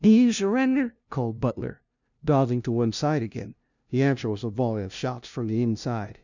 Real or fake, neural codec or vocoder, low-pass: fake; codec, 16 kHz, 0.5 kbps, FunCodec, trained on LibriTTS, 25 frames a second; 7.2 kHz